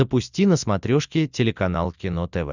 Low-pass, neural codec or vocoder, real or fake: 7.2 kHz; none; real